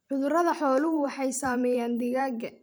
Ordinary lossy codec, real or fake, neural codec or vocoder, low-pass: none; fake; vocoder, 44.1 kHz, 128 mel bands every 512 samples, BigVGAN v2; none